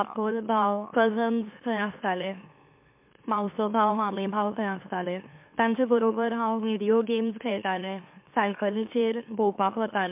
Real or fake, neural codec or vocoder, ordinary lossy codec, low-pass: fake; autoencoder, 44.1 kHz, a latent of 192 numbers a frame, MeloTTS; MP3, 32 kbps; 3.6 kHz